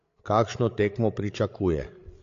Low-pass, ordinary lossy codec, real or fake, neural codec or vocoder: 7.2 kHz; AAC, 48 kbps; fake; codec, 16 kHz, 8 kbps, FreqCodec, larger model